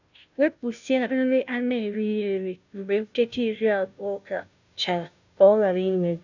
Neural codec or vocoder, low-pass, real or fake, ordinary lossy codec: codec, 16 kHz, 0.5 kbps, FunCodec, trained on Chinese and English, 25 frames a second; 7.2 kHz; fake; none